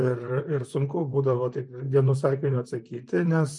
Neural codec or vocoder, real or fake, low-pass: vocoder, 44.1 kHz, 128 mel bands, Pupu-Vocoder; fake; 10.8 kHz